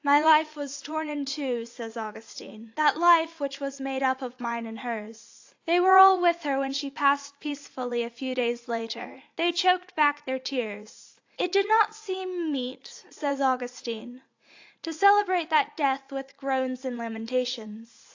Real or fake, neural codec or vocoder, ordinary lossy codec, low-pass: fake; vocoder, 44.1 kHz, 80 mel bands, Vocos; AAC, 48 kbps; 7.2 kHz